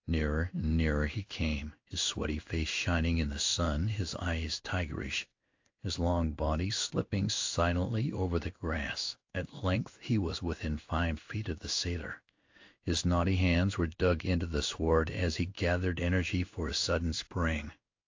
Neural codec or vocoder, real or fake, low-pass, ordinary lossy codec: codec, 16 kHz in and 24 kHz out, 1 kbps, XY-Tokenizer; fake; 7.2 kHz; AAC, 48 kbps